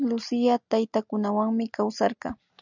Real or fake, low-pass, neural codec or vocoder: real; 7.2 kHz; none